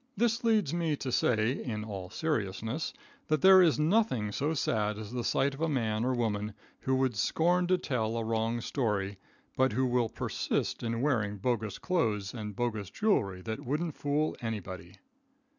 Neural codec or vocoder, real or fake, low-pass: none; real; 7.2 kHz